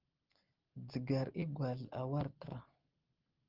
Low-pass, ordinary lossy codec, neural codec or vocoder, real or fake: 5.4 kHz; Opus, 16 kbps; none; real